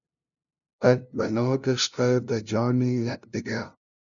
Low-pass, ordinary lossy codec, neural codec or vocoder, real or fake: 7.2 kHz; MP3, 96 kbps; codec, 16 kHz, 0.5 kbps, FunCodec, trained on LibriTTS, 25 frames a second; fake